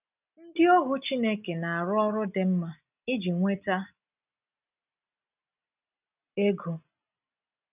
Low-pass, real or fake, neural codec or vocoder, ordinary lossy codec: 3.6 kHz; real; none; none